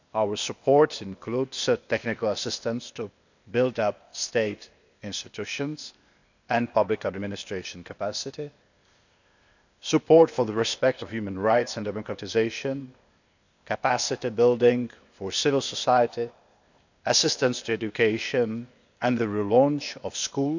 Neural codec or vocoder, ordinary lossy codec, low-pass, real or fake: codec, 16 kHz, 0.8 kbps, ZipCodec; none; 7.2 kHz; fake